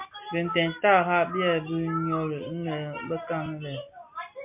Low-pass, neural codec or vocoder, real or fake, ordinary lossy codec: 3.6 kHz; none; real; MP3, 32 kbps